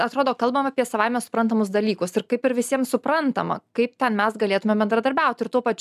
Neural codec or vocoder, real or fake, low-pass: none; real; 14.4 kHz